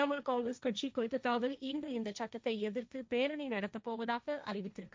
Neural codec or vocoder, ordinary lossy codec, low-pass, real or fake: codec, 16 kHz, 1.1 kbps, Voila-Tokenizer; none; none; fake